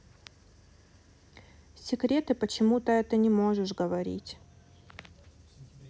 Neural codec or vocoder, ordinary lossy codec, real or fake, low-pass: none; none; real; none